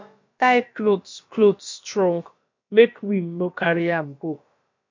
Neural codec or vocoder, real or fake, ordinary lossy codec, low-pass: codec, 16 kHz, about 1 kbps, DyCAST, with the encoder's durations; fake; MP3, 48 kbps; 7.2 kHz